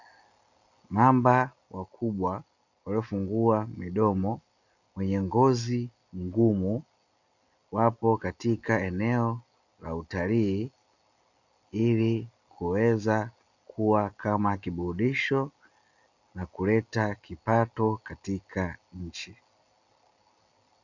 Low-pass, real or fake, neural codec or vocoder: 7.2 kHz; real; none